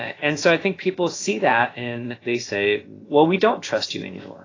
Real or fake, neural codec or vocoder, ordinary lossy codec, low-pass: fake; codec, 16 kHz, about 1 kbps, DyCAST, with the encoder's durations; AAC, 32 kbps; 7.2 kHz